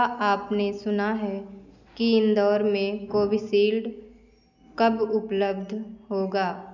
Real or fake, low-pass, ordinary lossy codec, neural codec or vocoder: real; 7.2 kHz; none; none